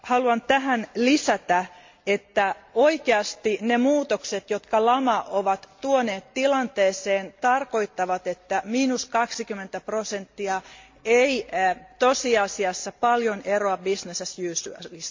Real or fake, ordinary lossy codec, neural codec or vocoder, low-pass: real; MP3, 48 kbps; none; 7.2 kHz